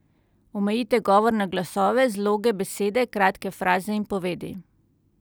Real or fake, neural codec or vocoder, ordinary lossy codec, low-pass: real; none; none; none